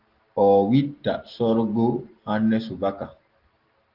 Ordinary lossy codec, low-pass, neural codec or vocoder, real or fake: Opus, 16 kbps; 5.4 kHz; none; real